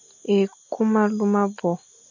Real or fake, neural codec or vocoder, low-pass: real; none; 7.2 kHz